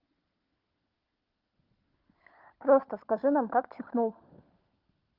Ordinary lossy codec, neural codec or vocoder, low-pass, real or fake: Opus, 32 kbps; codec, 16 kHz, 16 kbps, FunCodec, trained on LibriTTS, 50 frames a second; 5.4 kHz; fake